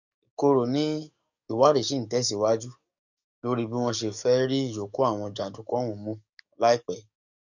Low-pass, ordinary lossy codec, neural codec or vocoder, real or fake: 7.2 kHz; none; codec, 44.1 kHz, 7.8 kbps, DAC; fake